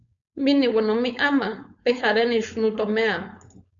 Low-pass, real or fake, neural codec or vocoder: 7.2 kHz; fake; codec, 16 kHz, 4.8 kbps, FACodec